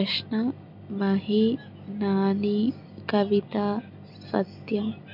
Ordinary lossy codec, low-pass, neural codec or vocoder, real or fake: none; 5.4 kHz; none; real